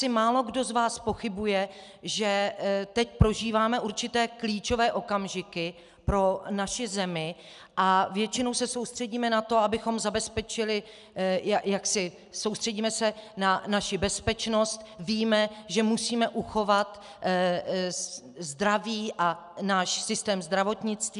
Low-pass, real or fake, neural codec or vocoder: 10.8 kHz; real; none